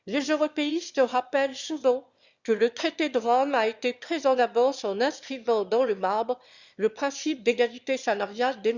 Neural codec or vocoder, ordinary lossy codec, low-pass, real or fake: autoencoder, 22.05 kHz, a latent of 192 numbers a frame, VITS, trained on one speaker; Opus, 64 kbps; 7.2 kHz; fake